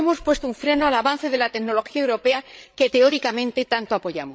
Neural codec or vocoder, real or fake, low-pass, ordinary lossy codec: codec, 16 kHz, 8 kbps, FreqCodec, larger model; fake; none; none